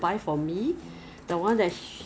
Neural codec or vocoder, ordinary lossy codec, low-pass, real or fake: none; none; none; real